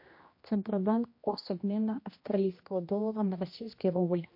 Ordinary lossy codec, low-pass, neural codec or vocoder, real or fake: MP3, 32 kbps; 5.4 kHz; codec, 16 kHz, 1 kbps, X-Codec, HuBERT features, trained on general audio; fake